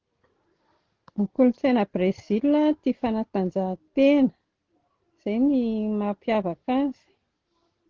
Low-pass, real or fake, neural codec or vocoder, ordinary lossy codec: 7.2 kHz; real; none; Opus, 16 kbps